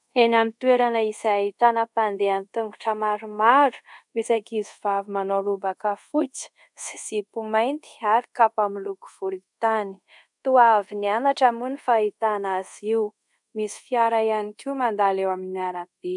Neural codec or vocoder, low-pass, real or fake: codec, 24 kHz, 0.5 kbps, DualCodec; 10.8 kHz; fake